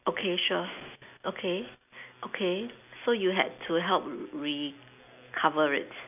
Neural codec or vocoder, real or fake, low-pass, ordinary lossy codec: none; real; 3.6 kHz; none